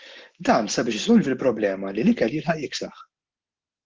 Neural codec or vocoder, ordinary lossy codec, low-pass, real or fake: none; Opus, 16 kbps; 7.2 kHz; real